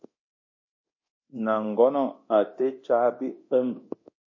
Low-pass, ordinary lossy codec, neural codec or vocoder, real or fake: 7.2 kHz; MP3, 32 kbps; codec, 24 kHz, 0.9 kbps, DualCodec; fake